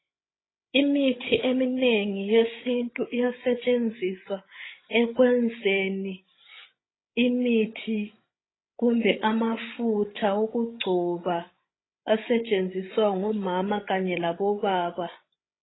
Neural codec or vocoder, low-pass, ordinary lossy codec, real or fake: codec, 16 kHz, 16 kbps, FreqCodec, larger model; 7.2 kHz; AAC, 16 kbps; fake